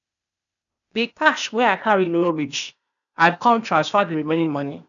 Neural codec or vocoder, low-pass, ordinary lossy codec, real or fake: codec, 16 kHz, 0.8 kbps, ZipCodec; 7.2 kHz; none; fake